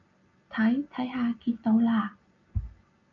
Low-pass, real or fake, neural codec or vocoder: 7.2 kHz; real; none